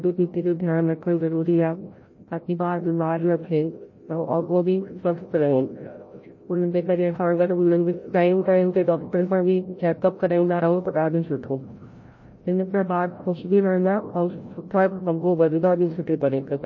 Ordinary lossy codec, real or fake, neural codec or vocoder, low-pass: MP3, 32 kbps; fake; codec, 16 kHz, 0.5 kbps, FreqCodec, larger model; 7.2 kHz